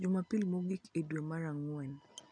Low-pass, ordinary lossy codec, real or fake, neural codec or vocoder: 9.9 kHz; none; real; none